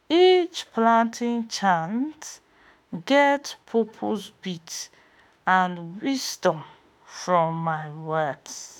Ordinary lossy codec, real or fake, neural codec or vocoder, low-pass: none; fake; autoencoder, 48 kHz, 32 numbers a frame, DAC-VAE, trained on Japanese speech; none